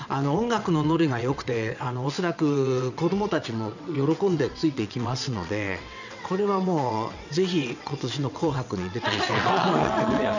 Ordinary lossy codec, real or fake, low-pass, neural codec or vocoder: none; fake; 7.2 kHz; vocoder, 22.05 kHz, 80 mel bands, WaveNeXt